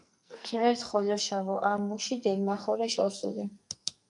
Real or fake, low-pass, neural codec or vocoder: fake; 10.8 kHz; codec, 44.1 kHz, 2.6 kbps, SNAC